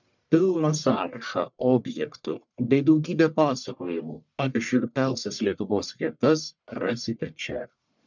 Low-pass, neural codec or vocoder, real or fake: 7.2 kHz; codec, 44.1 kHz, 1.7 kbps, Pupu-Codec; fake